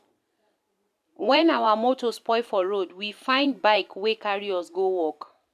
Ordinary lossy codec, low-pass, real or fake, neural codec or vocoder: MP3, 96 kbps; 14.4 kHz; fake; vocoder, 44.1 kHz, 128 mel bands every 512 samples, BigVGAN v2